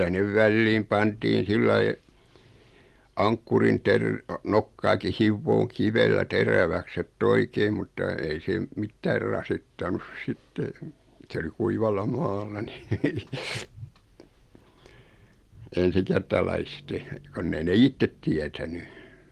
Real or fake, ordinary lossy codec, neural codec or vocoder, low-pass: real; Opus, 24 kbps; none; 14.4 kHz